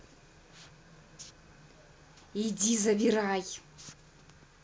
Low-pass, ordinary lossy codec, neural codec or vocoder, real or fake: none; none; none; real